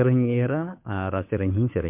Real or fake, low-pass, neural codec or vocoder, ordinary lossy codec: fake; 3.6 kHz; codec, 16 kHz, 2 kbps, FunCodec, trained on Chinese and English, 25 frames a second; MP3, 32 kbps